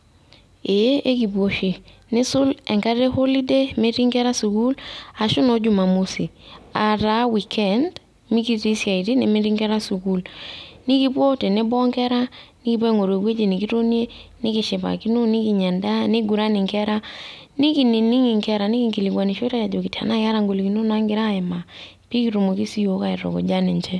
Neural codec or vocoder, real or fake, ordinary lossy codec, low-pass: none; real; none; none